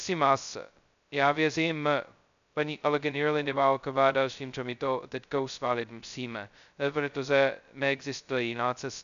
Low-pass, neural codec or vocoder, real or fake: 7.2 kHz; codec, 16 kHz, 0.2 kbps, FocalCodec; fake